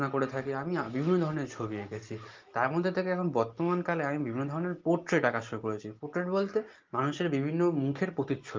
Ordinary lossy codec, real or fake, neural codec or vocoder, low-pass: Opus, 32 kbps; real; none; 7.2 kHz